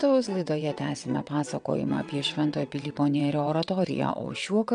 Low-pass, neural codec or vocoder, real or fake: 9.9 kHz; vocoder, 22.05 kHz, 80 mel bands, WaveNeXt; fake